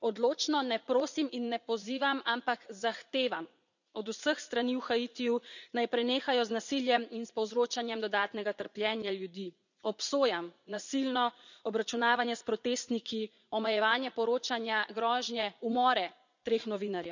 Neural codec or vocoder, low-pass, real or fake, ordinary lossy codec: vocoder, 44.1 kHz, 80 mel bands, Vocos; 7.2 kHz; fake; none